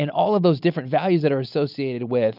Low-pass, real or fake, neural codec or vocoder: 5.4 kHz; real; none